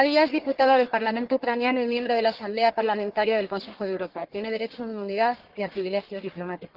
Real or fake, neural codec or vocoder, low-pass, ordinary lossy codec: fake; codec, 44.1 kHz, 1.7 kbps, Pupu-Codec; 5.4 kHz; Opus, 16 kbps